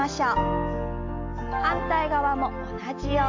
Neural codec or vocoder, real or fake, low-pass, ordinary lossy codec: none; real; 7.2 kHz; AAC, 48 kbps